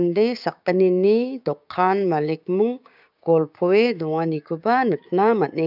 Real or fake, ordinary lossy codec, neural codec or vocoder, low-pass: fake; none; codec, 16 kHz, 6 kbps, DAC; 5.4 kHz